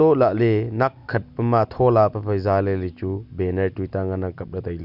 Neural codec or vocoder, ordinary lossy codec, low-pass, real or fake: none; none; 5.4 kHz; real